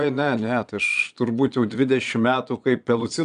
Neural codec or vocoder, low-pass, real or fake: vocoder, 22.05 kHz, 80 mel bands, Vocos; 9.9 kHz; fake